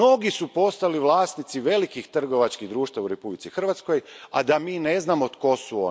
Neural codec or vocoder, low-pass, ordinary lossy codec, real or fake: none; none; none; real